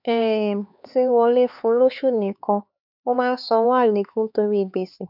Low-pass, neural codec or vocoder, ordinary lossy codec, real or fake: 5.4 kHz; codec, 16 kHz, 4 kbps, X-Codec, HuBERT features, trained on LibriSpeech; none; fake